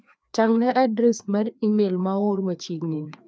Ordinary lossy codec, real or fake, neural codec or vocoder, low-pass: none; fake; codec, 16 kHz, 2 kbps, FreqCodec, larger model; none